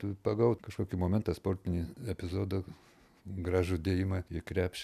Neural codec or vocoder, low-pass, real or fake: none; 14.4 kHz; real